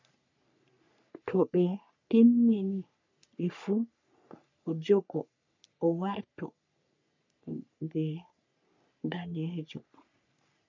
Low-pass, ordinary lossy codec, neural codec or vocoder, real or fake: 7.2 kHz; MP3, 64 kbps; codec, 44.1 kHz, 3.4 kbps, Pupu-Codec; fake